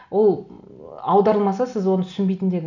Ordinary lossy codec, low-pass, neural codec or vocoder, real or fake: none; 7.2 kHz; none; real